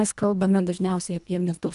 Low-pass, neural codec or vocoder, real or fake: 10.8 kHz; codec, 24 kHz, 1.5 kbps, HILCodec; fake